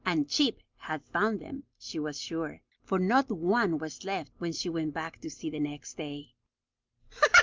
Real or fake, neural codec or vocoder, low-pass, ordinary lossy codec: real; none; 7.2 kHz; Opus, 24 kbps